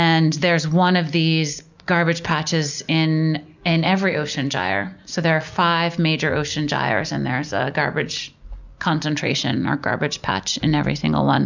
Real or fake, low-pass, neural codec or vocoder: real; 7.2 kHz; none